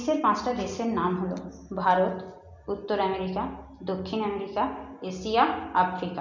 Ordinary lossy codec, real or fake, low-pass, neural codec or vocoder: MP3, 64 kbps; real; 7.2 kHz; none